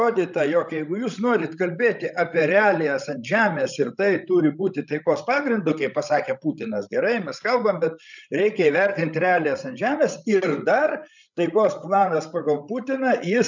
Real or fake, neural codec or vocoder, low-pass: fake; codec, 16 kHz, 16 kbps, FreqCodec, larger model; 7.2 kHz